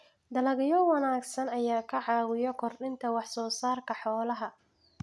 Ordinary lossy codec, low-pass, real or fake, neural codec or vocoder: none; none; real; none